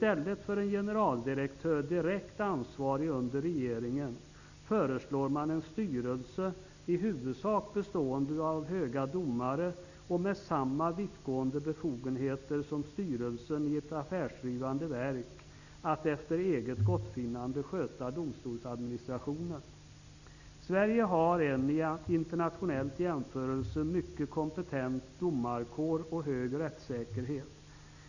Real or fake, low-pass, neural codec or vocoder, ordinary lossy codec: real; 7.2 kHz; none; none